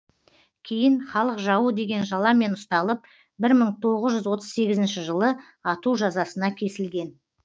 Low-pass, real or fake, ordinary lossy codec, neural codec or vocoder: none; fake; none; codec, 16 kHz, 6 kbps, DAC